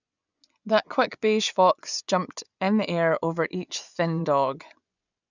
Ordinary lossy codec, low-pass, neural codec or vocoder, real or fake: none; 7.2 kHz; vocoder, 24 kHz, 100 mel bands, Vocos; fake